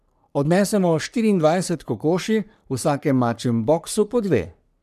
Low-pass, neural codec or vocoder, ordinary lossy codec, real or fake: 14.4 kHz; codec, 44.1 kHz, 3.4 kbps, Pupu-Codec; none; fake